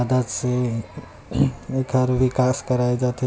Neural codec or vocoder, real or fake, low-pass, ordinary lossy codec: none; real; none; none